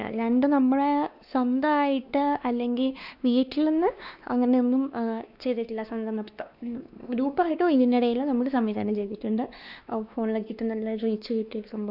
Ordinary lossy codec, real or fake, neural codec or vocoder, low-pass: none; fake; codec, 16 kHz, 2 kbps, X-Codec, WavLM features, trained on Multilingual LibriSpeech; 5.4 kHz